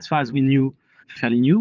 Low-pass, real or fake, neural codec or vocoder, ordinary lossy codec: 7.2 kHz; fake; vocoder, 44.1 kHz, 80 mel bands, Vocos; Opus, 32 kbps